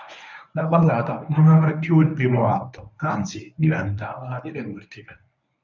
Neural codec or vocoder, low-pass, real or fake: codec, 24 kHz, 0.9 kbps, WavTokenizer, medium speech release version 1; 7.2 kHz; fake